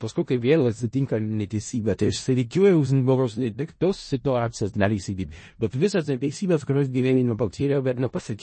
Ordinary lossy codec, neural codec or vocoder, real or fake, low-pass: MP3, 32 kbps; codec, 16 kHz in and 24 kHz out, 0.4 kbps, LongCat-Audio-Codec, four codebook decoder; fake; 9.9 kHz